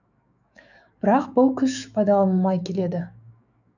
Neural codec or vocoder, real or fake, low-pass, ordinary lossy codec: autoencoder, 48 kHz, 128 numbers a frame, DAC-VAE, trained on Japanese speech; fake; 7.2 kHz; none